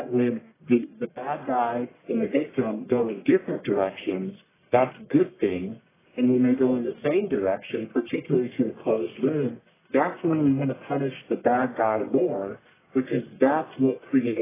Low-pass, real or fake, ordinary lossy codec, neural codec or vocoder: 3.6 kHz; fake; AAC, 16 kbps; codec, 44.1 kHz, 1.7 kbps, Pupu-Codec